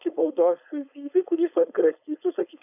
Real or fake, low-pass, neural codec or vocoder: fake; 3.6 kHz; codec, 16 kHz, 4.8 kbps, FACodec